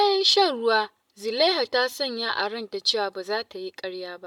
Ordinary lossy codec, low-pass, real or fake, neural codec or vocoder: MP3, 96 kbps; 19.8 kHz; real; none